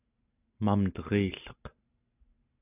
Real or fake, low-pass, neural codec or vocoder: real; 3.6 kHz; none